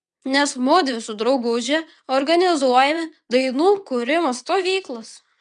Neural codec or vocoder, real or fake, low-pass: vocoder, 22.05 kHz, 80 mel bands, WaveNeXt; fake; 9.9 kHz